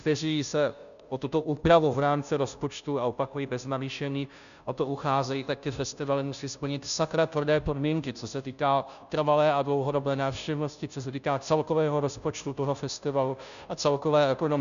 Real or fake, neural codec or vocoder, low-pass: fake; codec, 16 kHz, 0.5 kbps, FunCodec, trained on Chinese and English, 25 frames a second; 7.2 kHz